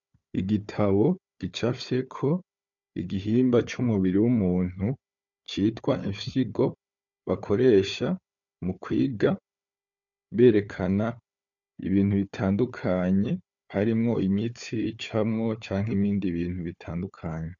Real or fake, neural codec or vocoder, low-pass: fake; codec, 16 kHz, 4 kbps, FunCodec, trained on Chinese and English, 50 frames a second; 7.2 kHz